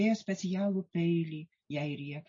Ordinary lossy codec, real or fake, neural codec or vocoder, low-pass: MP3, 32 kbps; real; none; 7.2 kHz